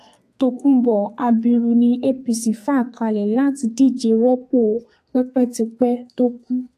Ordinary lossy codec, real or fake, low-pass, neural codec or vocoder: AAC, 64 kbps; fake; 14.4 kHz; codec, 32 kHz, 1.9 kbps, SNAC